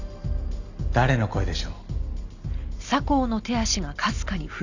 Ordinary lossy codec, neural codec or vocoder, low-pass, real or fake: Opus, 64 kbps; none; 7.2 kHz; real